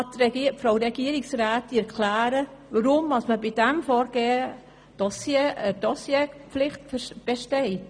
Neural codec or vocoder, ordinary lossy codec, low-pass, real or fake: none; none; 9.9 kHz; real